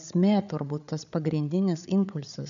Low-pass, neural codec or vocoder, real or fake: 7.2 kHz; codec, 16 kHz, 8 kbps, FreqCodec, larger model; fake